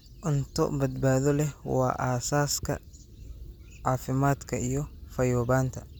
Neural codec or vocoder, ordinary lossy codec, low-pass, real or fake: none; none; none; real